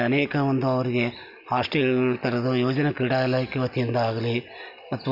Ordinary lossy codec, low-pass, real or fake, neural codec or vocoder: AAC, 48 kbps; 5.4 kHz; real; none